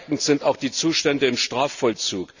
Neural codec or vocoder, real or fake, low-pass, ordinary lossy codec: none; real; 7.2 kHz; none